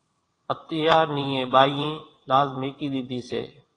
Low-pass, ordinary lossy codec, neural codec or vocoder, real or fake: 9.9 kHz; AAC, 32 kbps; vocoder, 22.05 kHz, 80 mel bands, WaveNeXt; fake